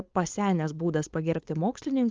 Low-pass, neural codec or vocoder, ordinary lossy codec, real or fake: 7.2 kHz; codec, 16 kHz, 4.8 kbps, FACodec; Opus, 24 kbps; fake